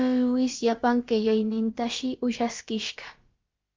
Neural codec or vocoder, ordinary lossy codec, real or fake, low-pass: codec, 16 kHz, about 1 kbps, DyCAST, with the encoder's durations; Opus, 32 kbps; fake; 7.2 kHz